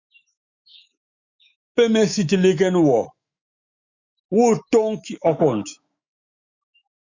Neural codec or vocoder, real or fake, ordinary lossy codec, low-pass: autoencoder, 48 kHz, 128 numbers a frame, DAC-VAE, trained on Japanese speech; fake; Opus, 64 kbps; 7.2 kHz